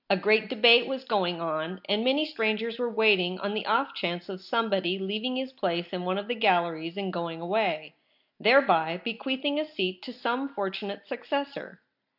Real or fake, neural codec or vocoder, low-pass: real; none; 5.4 kHz